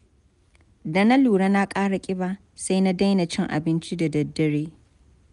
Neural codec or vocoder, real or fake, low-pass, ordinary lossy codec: none; real; 10.8 kHz; none